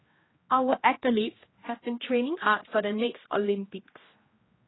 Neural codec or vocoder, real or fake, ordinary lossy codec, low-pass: codec, 16 kHz, 1 kbps, X-Codec, HuBERT features, trained on general audio; fake; AAC, 16 kbps; 7.2 kHz